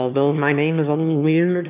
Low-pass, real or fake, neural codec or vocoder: 3.6 kHz; fake; autoencoder, 22.05 kHz, a latent of 192 numbers a frame, VITS, trained on one speaker